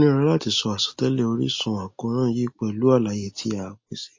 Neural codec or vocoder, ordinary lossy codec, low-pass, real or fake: none; MP3, 32 kbps; 7.2 kHz; real